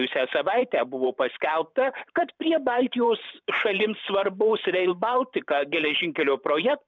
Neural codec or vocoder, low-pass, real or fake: vocoder, 44.1 kHz, 128 mel bands every 512 samples, BigVGAN v2; 7.2 kHz; fake